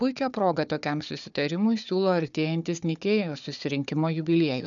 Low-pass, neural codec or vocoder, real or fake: 7.2 kHz; codec, 16 kHz, 4 kbps, FunCodec, trained on Chinese and English, 50 frames a second; fake